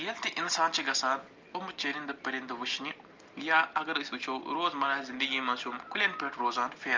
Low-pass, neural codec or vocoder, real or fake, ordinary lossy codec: 7.2 kHz; none; real; Opus, 32 kbps